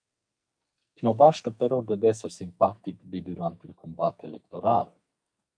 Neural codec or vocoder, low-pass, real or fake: codec, 44.1 kHz, 2.6 kbps, SNAC; 9.9 kHz; fake